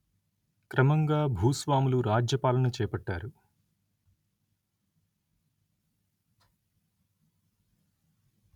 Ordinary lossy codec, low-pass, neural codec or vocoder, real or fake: none; 19.8 kHz; none; real